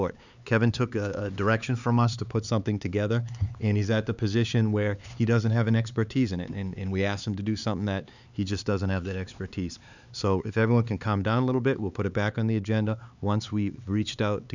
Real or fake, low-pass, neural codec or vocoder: fake; 7.2 kHz; codec, 16 kHz, 4 kbps, X-Codec, HuBERT features, trained on LibriSpeech